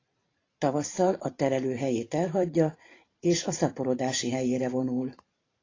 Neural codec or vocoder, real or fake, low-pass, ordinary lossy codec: vocoder, 44.1 kHz, 128 mel bands every 512 samples, BigVGAN v2; fake; 7.2 kHz; AAC, 32 kbps